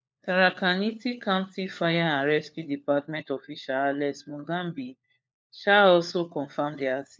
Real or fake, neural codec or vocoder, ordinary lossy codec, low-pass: fake; codec, 16 kHz, 4 kbps, FunCodec, trained on LibriTTS, 50 frames a second; none; none